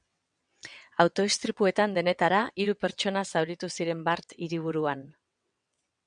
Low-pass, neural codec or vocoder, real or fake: 9.9 kHz; vocoder, 22.05 kHz, 80 mel bands, WaveNeXt; fake